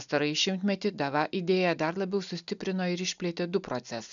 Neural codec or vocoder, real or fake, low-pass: none; real; 7.2 kHz